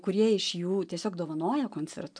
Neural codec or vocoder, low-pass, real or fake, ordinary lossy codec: none; 9.9 kHz; real; MP3, 96 kbps